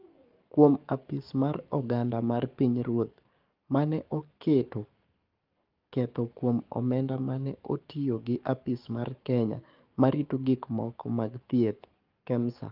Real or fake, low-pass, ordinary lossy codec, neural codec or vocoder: fake; 5.4 kHz; Opus, 32 kbps; codec, 44.1 kHz, 7.8 kbps, DAC